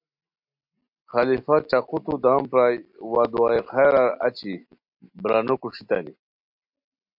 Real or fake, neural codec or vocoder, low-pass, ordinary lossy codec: real; none; 5.4 kHz; MP3, 48 kbps